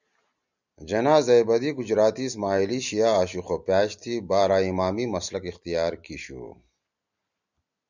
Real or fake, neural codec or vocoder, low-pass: real; none; 7.2 kHz